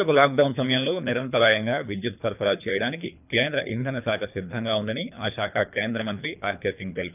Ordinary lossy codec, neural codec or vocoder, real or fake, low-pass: none; codec, 24 kHz, 3 kbps, HILCodec; fake; 3.6 kHz